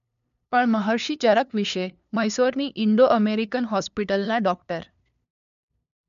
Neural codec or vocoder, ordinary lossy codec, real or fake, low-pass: codec, 16 kHz, 2 kbps, FunCodec, trained on LibriTTS, 25 frames a second; none; fake; 7.2 kHz